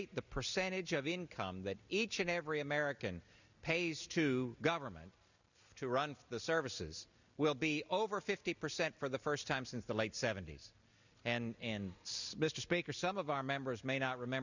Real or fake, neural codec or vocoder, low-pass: real; none; 7.2 kHz